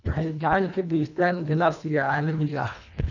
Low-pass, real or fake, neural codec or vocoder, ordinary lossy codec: 7.2 kHz; fake; codec, 24 kHz, 1.5 kbps, HILCodec; none